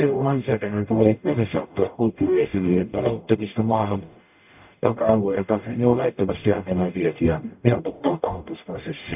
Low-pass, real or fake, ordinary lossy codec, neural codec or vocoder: 3.6 kHz; fake; none; codec, 44.1 kHz, 0.9 kbps, DAC